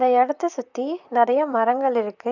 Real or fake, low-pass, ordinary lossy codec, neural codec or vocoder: fake; 7.2 kHz; none; vocoder, 44.1 kHz, 128 mel bands, Pupu-Vocoder